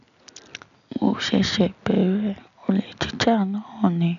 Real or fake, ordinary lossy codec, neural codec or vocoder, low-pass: real; MP3, 96 kbps; none; 7.2 kHz